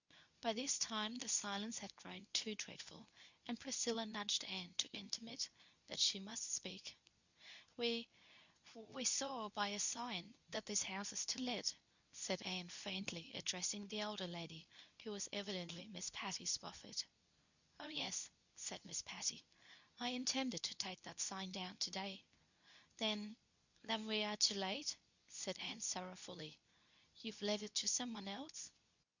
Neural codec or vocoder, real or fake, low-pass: codec, 24 kHz, 0.9 kbps, WavTokenizer, medium speech release version 2; fake; 7.2 kHz